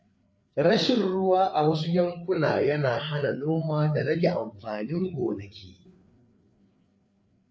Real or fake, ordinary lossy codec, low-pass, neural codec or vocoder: fake; none; none; codec, 16 kHz, 4 kbps, FreqCodec, larger model